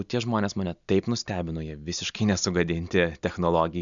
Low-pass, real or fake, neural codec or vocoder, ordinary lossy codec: 7.2 kHz; real; none; MP3, 96 kbps